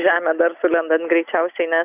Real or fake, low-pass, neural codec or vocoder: real; 3.6 kHz; none